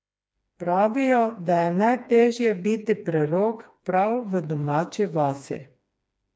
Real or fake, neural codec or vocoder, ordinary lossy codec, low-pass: fake; codec, 16 kHz, 2 kbps, FreqCodec, smaller model; none; none